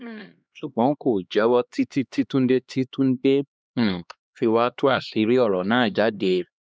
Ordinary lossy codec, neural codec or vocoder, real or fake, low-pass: none; codec, 16 kHz, 2 kbps, X-Codec, HuBERT features, trained on LibriSpeech; fake; none